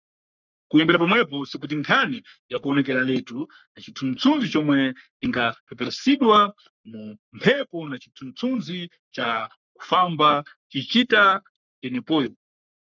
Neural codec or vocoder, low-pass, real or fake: codec, 44.1 kHz, 3.4 kbps, Pupu-Codec; 7.2 kHz; fake